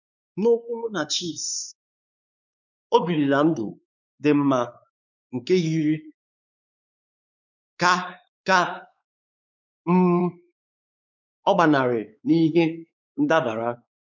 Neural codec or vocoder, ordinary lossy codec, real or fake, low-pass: codec, 16 kHz, 4 kbps, X-Codec, WavLM features, trained on Multilingual LibriSpeech; none; fake; 7.2 kHz